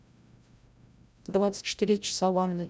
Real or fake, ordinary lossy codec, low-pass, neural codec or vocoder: fake; none; none; codec, 16 kHz, 0.5 kbps, FreqCodec, larger model